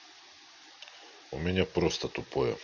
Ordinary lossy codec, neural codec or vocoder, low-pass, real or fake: none; none; 7.2 kHz; real